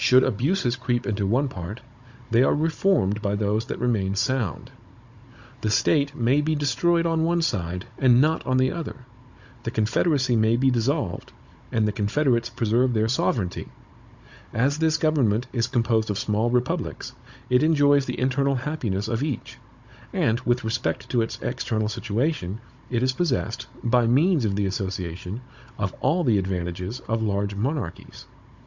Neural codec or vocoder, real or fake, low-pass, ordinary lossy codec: codec, 16 kHz, 16 kbps, FunCodec, trained on Chinese and English, 50 frames a second; fake; 7.2 kHz; Opus, 64 kbps